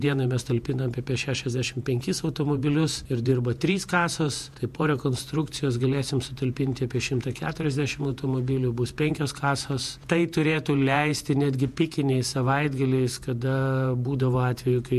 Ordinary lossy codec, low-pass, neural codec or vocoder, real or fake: MP3, 64 kbps; 14.4 kHz; vocoder, 48 kHz, 128 mel bands, Vocos; fake